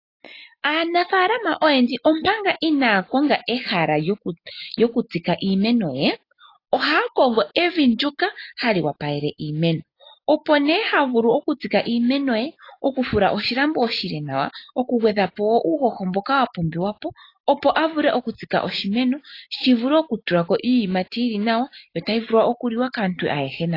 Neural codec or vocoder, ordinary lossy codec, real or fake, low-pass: none; AAC, 32 kbps; real; 5.4 kHz